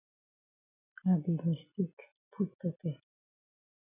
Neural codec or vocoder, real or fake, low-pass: none; real; 3.6 kHz